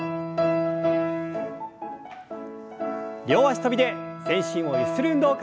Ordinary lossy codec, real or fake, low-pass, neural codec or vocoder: none; real; none; none